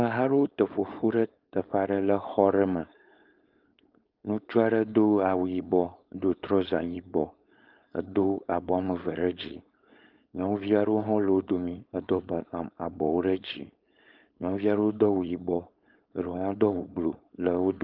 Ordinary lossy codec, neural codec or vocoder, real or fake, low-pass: Opus, 32 kbps; codec, 16 kHz, 4.8 kbps, FACodec; fake; 5.4 kHz